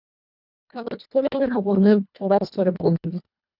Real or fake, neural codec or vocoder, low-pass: fake; codec, 24 kHz, 1.5 kbps, HILCodec; 5.4 kHz